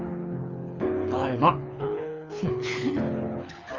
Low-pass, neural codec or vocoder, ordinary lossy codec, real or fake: 7.2 kHz; codec, 24 kHz, 6 kbps, HILCodec; Opus, 32 kbps; fake